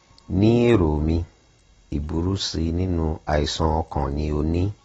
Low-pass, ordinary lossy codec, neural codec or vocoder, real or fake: 10.8 kHz; AAC, 24 kbps; none; real